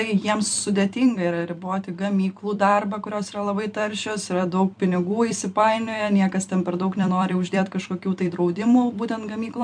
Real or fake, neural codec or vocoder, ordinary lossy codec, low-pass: fake; vocoder, 44.1 kHz, 128 mel bands every 256 samples, BigVGAN v2; MP3, 64 kbps; 9.9 kHz